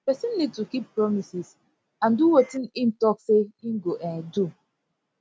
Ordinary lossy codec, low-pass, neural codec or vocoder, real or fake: none; none; none; real